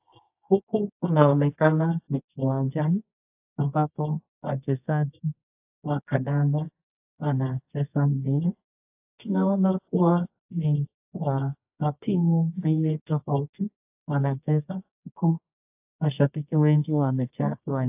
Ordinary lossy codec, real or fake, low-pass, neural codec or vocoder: AAC, 32 kbps; fake; 3.6 kHz; codec, 24 kHz, 0.9 kbps, WavTokenizer, medium music audio release